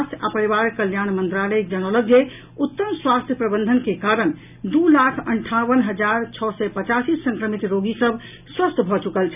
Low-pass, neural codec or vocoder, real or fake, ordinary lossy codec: 3.6 kHz; none; real; none